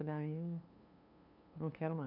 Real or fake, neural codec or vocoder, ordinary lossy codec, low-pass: fake; codec, 16 kHz, 2 kbps, FunCodec, trained on LibriTTS, 25 frames a second; none; 5.4 kHz